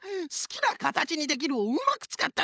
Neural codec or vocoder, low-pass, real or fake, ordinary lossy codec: codec, 16 kHz, 16 kbps, FunCodec, trained on LibriTTS, 50 frames a second; none; fake; none